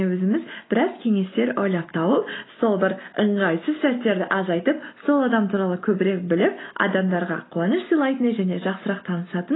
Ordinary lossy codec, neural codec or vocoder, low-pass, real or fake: AAC, 16 kbps; none; 7.2 kHz; real